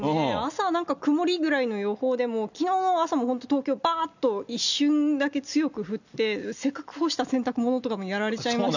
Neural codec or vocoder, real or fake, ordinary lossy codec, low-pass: none; real; none; 7.2 kHz